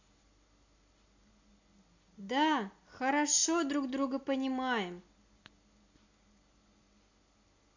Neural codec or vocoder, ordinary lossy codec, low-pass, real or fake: none; AAC, 48 kbps; 7.2 kHz; real